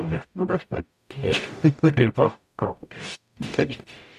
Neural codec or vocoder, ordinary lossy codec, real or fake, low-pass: codec, 44.1 kHz, 0.9 kbps, DAC; none; fake; 14.4 kHz